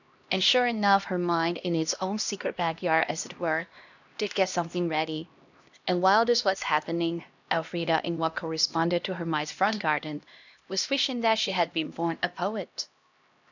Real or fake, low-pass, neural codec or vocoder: fake; 7.2 kHz; codec, 16 kHz, 1 kbps, X-Codec, HuBERT features, trained on LibriSpeech